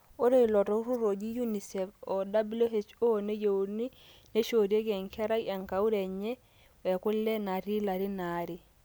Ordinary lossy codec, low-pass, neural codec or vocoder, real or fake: none; none; none; real